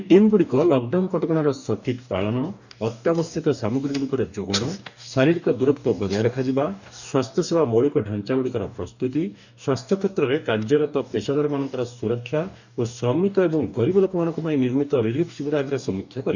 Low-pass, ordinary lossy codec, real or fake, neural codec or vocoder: 7.2 kHz; none; fake; codec, 44.1 kHz, 2.6 kbps, DAC